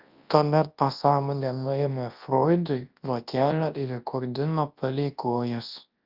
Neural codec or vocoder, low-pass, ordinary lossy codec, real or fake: codec, 24 kHz, 0.9 kbps, WavTokenizer, large speech release; 5.4 kHz; Opus, 24 kbps; fake